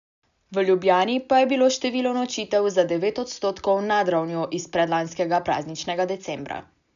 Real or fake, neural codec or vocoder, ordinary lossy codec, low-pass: real; none; MP3, 96 kbps; 7.2 kHz